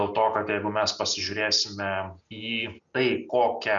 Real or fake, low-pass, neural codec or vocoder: real; 7.2 kHz; none